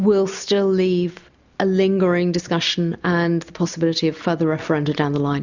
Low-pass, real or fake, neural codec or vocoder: 7.2 kHz; real; none